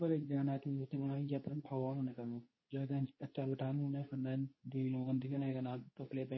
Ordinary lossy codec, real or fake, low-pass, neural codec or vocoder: MP3, 24 kbps; fake; 7.2 kHz; codec, 24 kHz, 0.9 kbps, WavTokenizer, medium speech release version 2